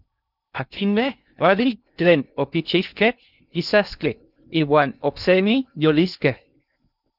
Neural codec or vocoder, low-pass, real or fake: codec, 16 kHz in and 24 kHz out, 0.6 kbps, FocalCodec, streaming, 2048 codes; 5.4 kHz; fake